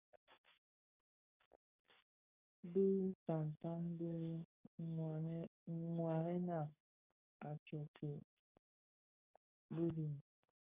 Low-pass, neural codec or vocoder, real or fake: 3.6 kHz; codec, 44.1 kHz, 7.8 kbps, DAC; fake